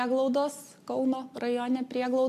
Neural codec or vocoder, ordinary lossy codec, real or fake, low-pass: none; AAC, 64 kbps; real; 14.4 kHz